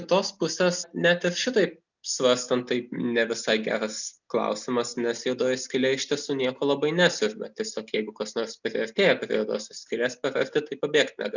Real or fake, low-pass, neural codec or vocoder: real; 7.2 kHz; none